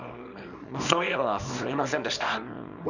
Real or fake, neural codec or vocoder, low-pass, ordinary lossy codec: fake; codec, 24 kHz, 0.9 kbps, WavTokenizer, small release; 7.2 kHz; none